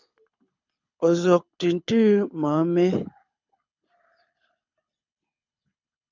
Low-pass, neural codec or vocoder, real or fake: 7.2 kHz; codec, 24 kHz, 6 kbps, HILCodec; fake